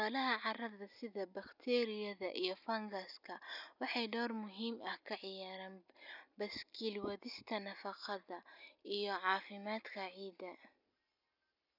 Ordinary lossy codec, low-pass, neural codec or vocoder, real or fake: none; 5.4 kHz; none; real